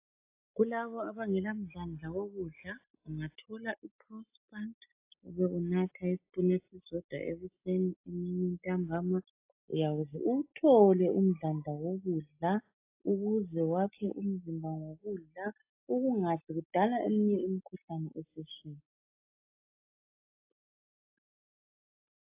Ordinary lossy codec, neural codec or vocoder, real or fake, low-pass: AAC, 32 kbps; none; real; 3.6 kHz